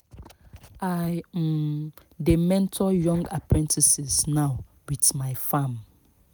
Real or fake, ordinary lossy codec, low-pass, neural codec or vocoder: real; none; none; none